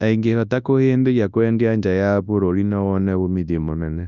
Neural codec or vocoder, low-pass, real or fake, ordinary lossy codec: codec, 24 kHz, 0.9 kbps, WavTokenizer, large speech release; 7.2 kHz; fake; none